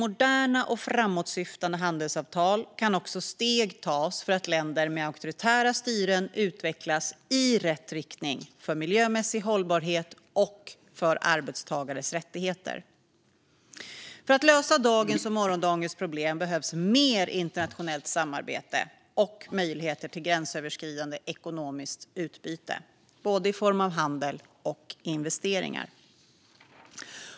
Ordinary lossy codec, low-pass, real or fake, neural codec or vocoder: none; none; real; none